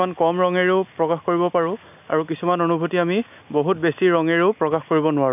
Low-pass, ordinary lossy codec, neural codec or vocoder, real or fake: 3.6 kHz; none; none; real